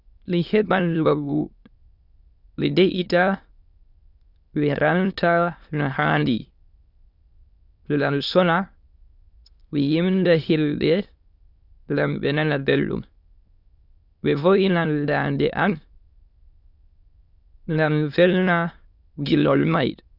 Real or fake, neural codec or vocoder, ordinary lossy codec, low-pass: fake; autoencoder, 22.05 kHz, a latent of 192 numbers a frame, VITS, trained on many speakers; Opus, 64 kbps; 5.4 kHz